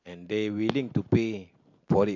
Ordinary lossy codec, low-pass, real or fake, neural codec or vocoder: MP3, 48 kbps; 7.2 kHz; real; none